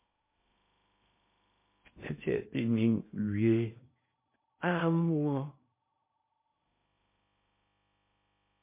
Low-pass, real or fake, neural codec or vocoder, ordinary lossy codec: 3.6 kHz; fake; codec, 16 kHz in and 24 kHz out, 0.8 kbps, FocalCodec, streaming, 65536 codes; MP3, 24 kbps